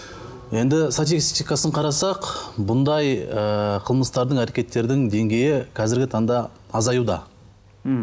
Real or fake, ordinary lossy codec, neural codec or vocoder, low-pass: real; none; none; none